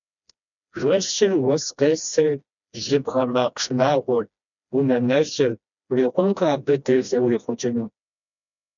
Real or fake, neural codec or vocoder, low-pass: fake; codec, 16 kHz, 1 kbps, FreqCodec, smaller model; 7.2 kHz